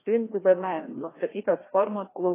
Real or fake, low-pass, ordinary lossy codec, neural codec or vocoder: fake; 3.6 kHz; AAC, 16 kbps; codec, 16 kHz, 1 kbps, FreqCodec, larger model